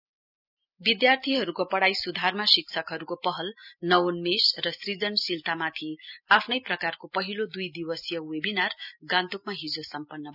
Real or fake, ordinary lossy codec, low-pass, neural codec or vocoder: real; none; 5.4 kHz; none